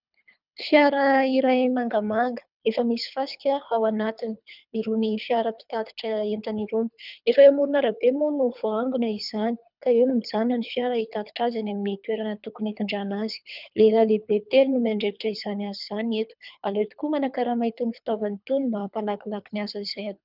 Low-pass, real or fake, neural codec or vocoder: 5.4 kHz; fake; codec, 24 kHz, 3 kbps, HILCodec